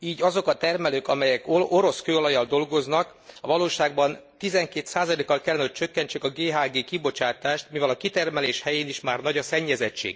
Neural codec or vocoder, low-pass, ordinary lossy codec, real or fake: none; none; none; real